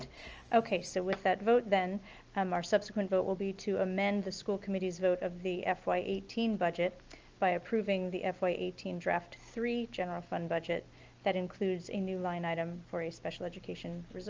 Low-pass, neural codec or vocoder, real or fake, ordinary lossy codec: 7.2 kHz; none; real; Opus, 24 kbps